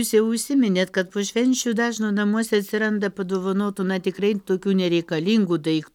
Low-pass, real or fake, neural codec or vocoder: 19.8 kHz; real; none